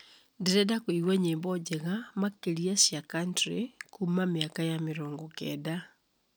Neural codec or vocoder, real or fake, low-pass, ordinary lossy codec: none; real; none; none